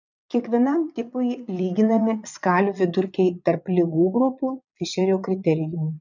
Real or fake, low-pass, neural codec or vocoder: fake; 7.2 kHz; vocoder, 22.05 kHz, 80 mel bands, Vocos